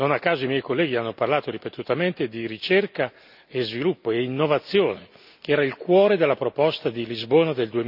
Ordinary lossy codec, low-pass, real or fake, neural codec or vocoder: none; 5.4 kHz; real; none